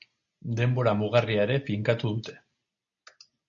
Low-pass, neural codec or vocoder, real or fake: 7.2 kHz; none; real